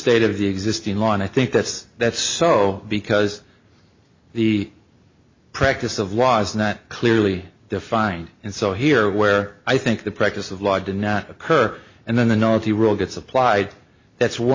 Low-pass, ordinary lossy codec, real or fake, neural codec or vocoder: 7.2 kHz; MP3, 32 kbps; real; none